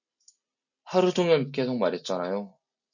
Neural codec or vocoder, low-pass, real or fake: none; 7.2 kHz; real